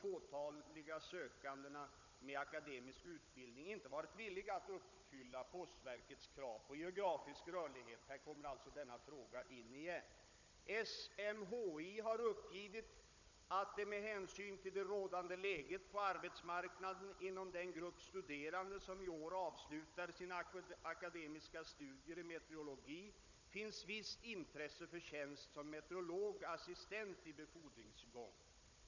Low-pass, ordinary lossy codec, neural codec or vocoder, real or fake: 7.2 kHz; none; codec, 16 kHz, 16 kbps, FunCodec, trained on Chinese and English, 50 frames a second; fake